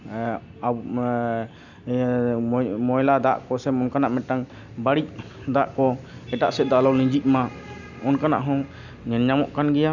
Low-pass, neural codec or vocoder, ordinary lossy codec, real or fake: 7.2 kHz; none; none; real